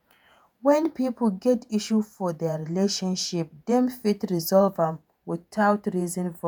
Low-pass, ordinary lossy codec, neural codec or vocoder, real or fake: none; none; vocoder, 48 kHz, 128 mel bands, Vocos; fake